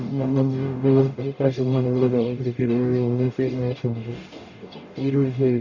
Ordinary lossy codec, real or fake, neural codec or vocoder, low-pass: none; fake; codec, 44.1 kHz, 0.9 kbps, DAC; 7.2 kHz